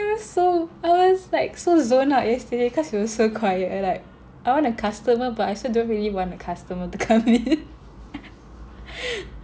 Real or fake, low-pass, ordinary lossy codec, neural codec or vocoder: real; none; none; none